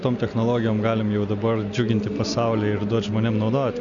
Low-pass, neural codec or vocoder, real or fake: 7.2 kHz; none; real